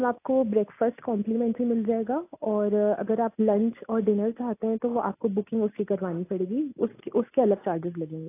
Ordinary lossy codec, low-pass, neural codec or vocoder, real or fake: AAC, 24 kbps; 3.6 kHz; none; real